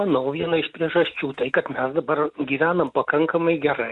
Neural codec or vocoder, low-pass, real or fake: none; 10.8 kHz; real